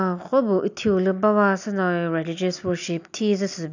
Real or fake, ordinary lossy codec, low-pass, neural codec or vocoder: real; none; 7.2 kHz; none